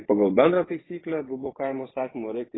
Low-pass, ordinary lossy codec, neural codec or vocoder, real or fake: 7.2 kHz; AAC, 16 kbps; vocoder, 24 kHz, 100 mel bands, Vocos; fake